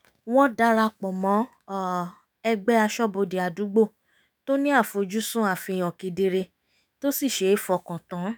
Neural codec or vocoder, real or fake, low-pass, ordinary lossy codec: autoencoder, 48 kHz, 128 numbers a frame, DAC-VAE, trained on Japanese speech; fake; none; none